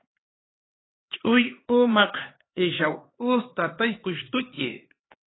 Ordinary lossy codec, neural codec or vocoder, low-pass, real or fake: AAC, 16 kbps; codec, 16 kHz, 4 kbps, X-Codec, HuBERT features, trained on LibriSpeech; 7.2 kHz; fake